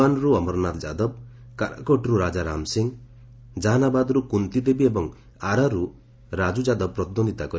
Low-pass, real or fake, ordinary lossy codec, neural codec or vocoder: none; real; none; none